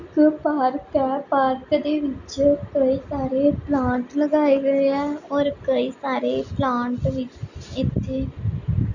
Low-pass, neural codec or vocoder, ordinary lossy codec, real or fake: 7.2 kHz; none; none; real